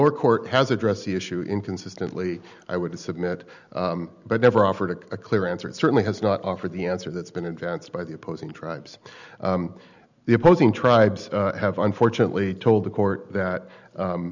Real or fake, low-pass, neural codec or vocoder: real; 7.2 kHz; none